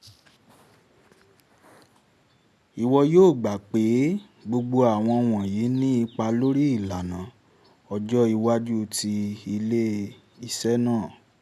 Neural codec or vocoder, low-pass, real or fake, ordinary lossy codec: none; 14.4 kHz; real; none